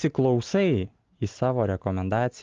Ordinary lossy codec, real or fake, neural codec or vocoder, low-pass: Opus, 32 kbps; real; none; 7.2 kHz